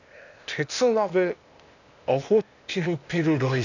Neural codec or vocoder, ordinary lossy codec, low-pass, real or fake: codec, 16 kHz, 0.8 kbps, ZipCodec; none; 7.2 kHz; fake